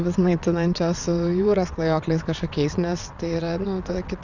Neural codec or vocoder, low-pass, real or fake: vocoder, 22.05 kHz, 80 mel bands, WaveNeXt; 7.2 kHz; fake